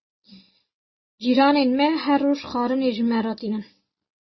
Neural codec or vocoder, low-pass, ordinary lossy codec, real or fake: none; 7.2 kHz; MP3, 24 kbps; real